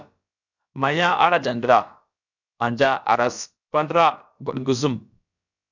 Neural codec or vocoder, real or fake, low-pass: codec, 16 kHz, about 1 kbps, DyCAST, with the encoder's durations; fake; 7.2 kHz